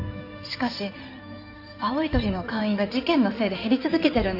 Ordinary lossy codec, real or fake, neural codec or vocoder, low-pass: none; fake; codec, 16 kHz in and 24 kHz out, 2.2 kbps, FireRedTTS-2 codec; 5.4 kHz